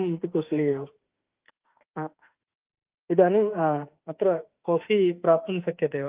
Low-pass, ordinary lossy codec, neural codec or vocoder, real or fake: 3.6 kHz; Opus, 32 kbps; autoencoder, 48 kHz, 32 numbers a frame, DAC-VAE, trained on Japanese speech; fake